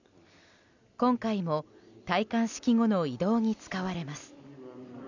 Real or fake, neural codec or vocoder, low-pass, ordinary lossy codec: real; none; 7.2 kHz; none